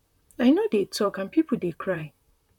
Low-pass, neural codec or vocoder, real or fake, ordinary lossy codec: 19.8 kHz; vocoder, 44.1 kHz, 128 mel bands, Pupu-Vocoder; fake; none